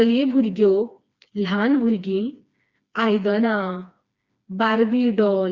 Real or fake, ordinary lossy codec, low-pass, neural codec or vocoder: fake; Opus, 64 kbps; 7.2 kHz; codec, 16 kHz, 2 kbps, FreqCodec, smaller model